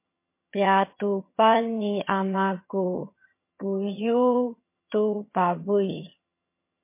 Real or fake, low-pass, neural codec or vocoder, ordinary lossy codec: fake; 3.6 kHz; vocoder, 22.05 kHz, 80 mel bands, HiFi-GAN; MP3, 24 kbps